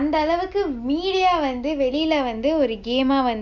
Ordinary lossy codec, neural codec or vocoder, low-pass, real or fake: none; none; 7.2 kHz; real